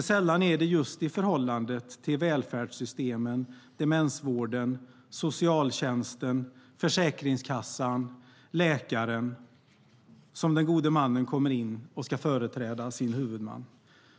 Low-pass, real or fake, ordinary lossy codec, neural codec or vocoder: none; real; none; none